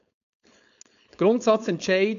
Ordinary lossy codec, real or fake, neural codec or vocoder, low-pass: none; fake; codec, 16 kHz, 4.8 kbps, FACodec; 7.2 kHz